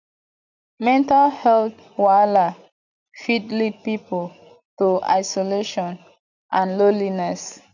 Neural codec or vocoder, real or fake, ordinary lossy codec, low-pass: none; real; none; 7.2 kHz